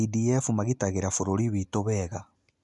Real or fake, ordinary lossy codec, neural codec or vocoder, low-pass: real; none; none; 10.8 kHz